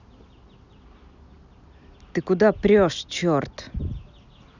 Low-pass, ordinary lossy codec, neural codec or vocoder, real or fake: 7.2 kHz; none; none; real